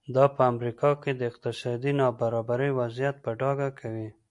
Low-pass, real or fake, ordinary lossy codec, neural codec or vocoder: 10.8 kHz; real; MP3, 96 kbps; none